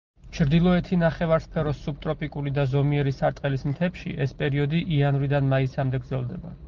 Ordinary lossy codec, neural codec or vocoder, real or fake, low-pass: Opus, 32 kbps; none; real; 7.2 kHz